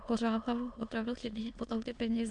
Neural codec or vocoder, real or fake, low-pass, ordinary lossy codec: autoencoder, 22.05 kHz, a latent of 192 numbers a frame, VITS, trained on many speakers; fake; 9.9 kHz; AAC, 64 kbps